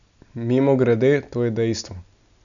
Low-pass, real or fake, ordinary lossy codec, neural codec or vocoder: 7.2 kHz; real; none; none